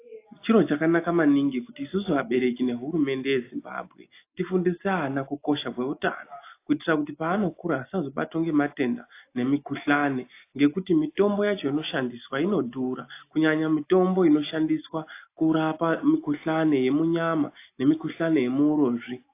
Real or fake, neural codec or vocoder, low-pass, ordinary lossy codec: real; none; 3.6 kHz; AAC, 24 kbps